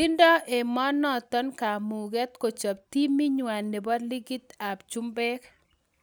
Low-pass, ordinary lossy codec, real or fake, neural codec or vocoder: none; none; real; none